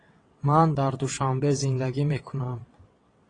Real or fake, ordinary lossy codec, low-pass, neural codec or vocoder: fake; AAC, 32 kbps; 9.9 kHz; vocoder, 22.05 kHz, 80 mel bands, WaveNeXt